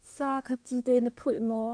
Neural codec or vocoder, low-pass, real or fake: codec, 24 kHz, 1 kbps, SNAC; 9.9 kHz; fake